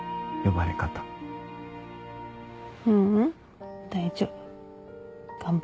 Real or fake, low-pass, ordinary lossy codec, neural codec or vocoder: real; none; none; none